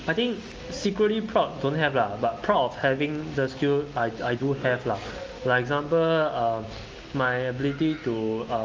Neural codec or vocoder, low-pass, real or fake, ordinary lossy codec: none; 7.2 kHz; real; Opus, 24 kbps